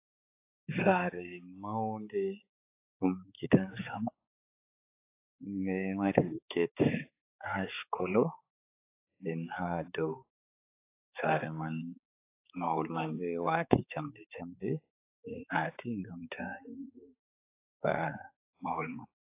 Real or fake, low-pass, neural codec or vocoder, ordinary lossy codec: fake; 3.6 kHz; codec, 16 kHz, 4 kbps, X-Codec, HuBERT features, trained on balanced general audio; AAC, 24 kbps